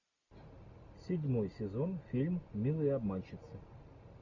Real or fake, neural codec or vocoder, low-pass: real; none; 7.2 kHz